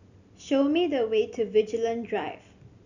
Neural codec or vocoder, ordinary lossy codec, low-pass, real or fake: none; none; 7.2 kHz; real